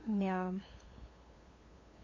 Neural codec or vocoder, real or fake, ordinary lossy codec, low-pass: codec, 16 kHz, 2 kbps, FunCodec, trained on LibriTTS, 25 frames a second; fake; MP3, 32 kbps; 7.2 kHz